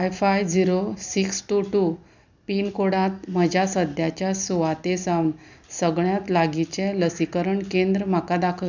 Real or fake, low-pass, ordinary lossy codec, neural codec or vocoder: real; 7.2 kHz; none; none